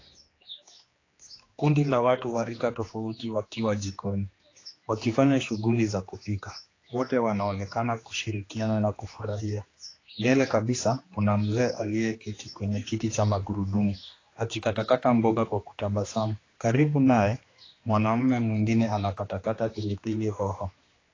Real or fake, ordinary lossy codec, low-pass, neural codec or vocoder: fake; AAC, 32 kbps; 7.2 kHz; codec, 16 kHz, 2 kbps, X-Codec, HuBERT features, trained on general audio